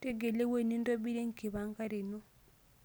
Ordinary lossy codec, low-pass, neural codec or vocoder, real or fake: none; none; none; real